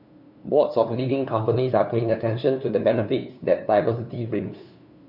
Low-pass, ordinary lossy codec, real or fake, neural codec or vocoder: 5.4 kHz; none; fake; codec, 16 kHz, 2 kbps, FunCodec, trained on LibriTTS, 25 frames a second